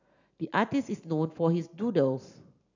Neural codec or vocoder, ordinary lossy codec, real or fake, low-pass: none; none; real; 7.2 kHz